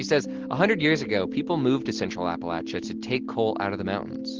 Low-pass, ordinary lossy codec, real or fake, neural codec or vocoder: 7.2 kHz; Opus, 16 kbps; real; none